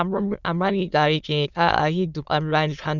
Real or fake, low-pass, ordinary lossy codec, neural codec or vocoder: fake; 7.2 kHz; none; autoencoder, 22.05 kHz, a latent of 192 numbers a frame, VITS, trained on many speakers